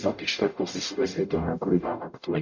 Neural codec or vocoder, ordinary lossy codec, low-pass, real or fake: codec, 44.1 kHz, 0.9 kbps, DAC; MP3, 64 kbps; 7.2 kHz; fake